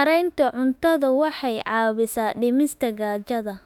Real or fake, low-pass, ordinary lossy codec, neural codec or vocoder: fake; 19.8 kHz; none; autoencoder, 48 kHz, 32 numbers a frame, DAC-VAE, trained on Japanese speech